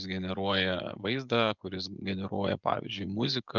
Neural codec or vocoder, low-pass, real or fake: vocoder, 24 kHz, 100 mel bands, Vocos; 7.2 kHz; fake